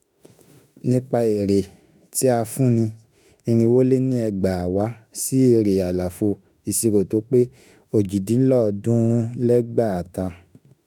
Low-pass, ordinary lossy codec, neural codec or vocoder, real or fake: 19.8 kHz; none; autoencoder, 48 kHz, 32 numbers a frame, DAC-VAE, trained on Japanese speech; fake